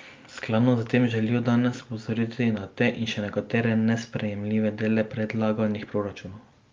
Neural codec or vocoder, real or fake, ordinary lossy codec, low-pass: none; real; Opus, 24 kbps; 7.2 kHz